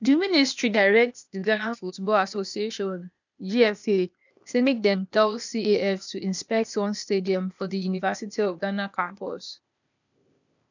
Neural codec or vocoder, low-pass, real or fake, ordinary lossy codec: codec, 16 kHz, 0.8 kbps, ZipCodec; 7.2 kHz; fake; none